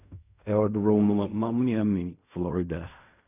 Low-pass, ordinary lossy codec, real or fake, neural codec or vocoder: 3.6 kHz; none; fake; codec, 16 kHz in and 24 kHz out, 0.4 kbps, LongCat-Audio-Codec, fine tuned four codebook decoder